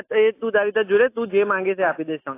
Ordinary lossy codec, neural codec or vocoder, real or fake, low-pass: AAC, 24 kbps; none; real; 3.6 kHz